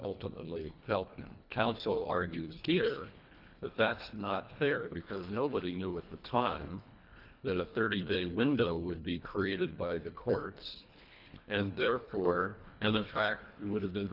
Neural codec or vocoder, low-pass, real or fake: codec, 24 kHz, 1.5 kbps, HILCodec; 5.4 kHz; fake